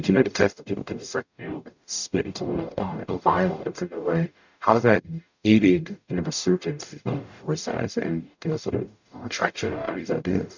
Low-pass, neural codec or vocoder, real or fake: 7.2 kHz; codec, 44.1 kHz, 0.9 kbps, DAC; fake